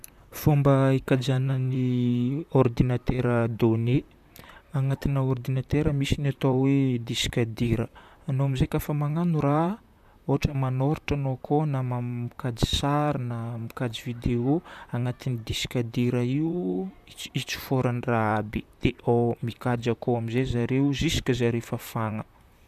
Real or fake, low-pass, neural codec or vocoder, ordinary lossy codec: fake; 14.4 kHz; vocoder, 44.1 kHz, 128 mel bands, Pupu-Vocoder; none